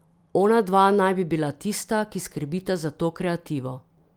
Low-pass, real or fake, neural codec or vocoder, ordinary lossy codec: 19.8 kHz; fake; vocoder, 44.1 kHz, 128 mel bands every 256 samples, BigVGAN v2; Opus, 32 kbps